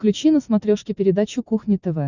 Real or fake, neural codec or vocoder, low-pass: real; none; 7.2 kHz